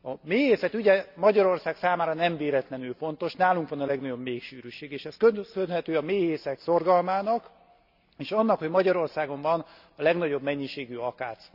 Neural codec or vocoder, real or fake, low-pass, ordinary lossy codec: none; real; 5.4 kHz; none